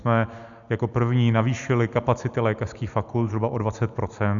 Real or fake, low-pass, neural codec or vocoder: real; 7.2 kHz; none